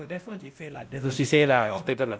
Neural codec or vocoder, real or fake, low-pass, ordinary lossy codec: codec, 16 kHz, 1 kbps, X-Codec, WavLM features, trained on Multilingual LibriSpeech; fake; none; none